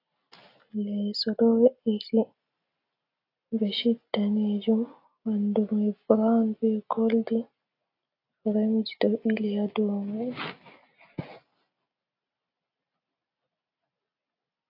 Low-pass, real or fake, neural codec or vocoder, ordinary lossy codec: 5.4 kHz; real; none; AAC, 32 kbps